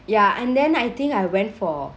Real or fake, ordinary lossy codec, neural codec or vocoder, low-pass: real; none; none; none